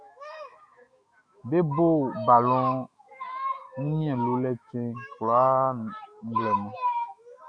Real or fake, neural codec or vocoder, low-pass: fake; autoencoder, 48 kHz, 128 numbers a frame, DAC-VAE, trained on Japanese speech; 9.9 kHz